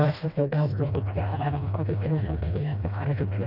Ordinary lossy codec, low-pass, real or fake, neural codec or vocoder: none; 5.4 kHz; fake; codec, 16 kHz, 1 kbps, FreqCodec, smaller model